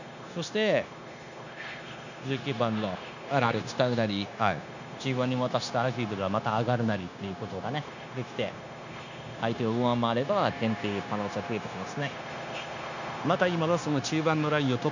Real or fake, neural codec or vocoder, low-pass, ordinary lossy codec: fake; codec, 16 kHz, 0.9 kbps, LongCat-Audio-Codec; 7.2 kHz; none